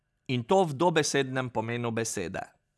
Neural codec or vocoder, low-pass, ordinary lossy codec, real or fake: none; none; none; real